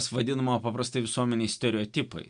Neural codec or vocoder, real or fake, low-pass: none; real; 9.9 kHz